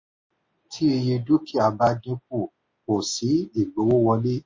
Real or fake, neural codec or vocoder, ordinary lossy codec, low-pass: real; none; MP3, 32 kbps; 7.2 kHz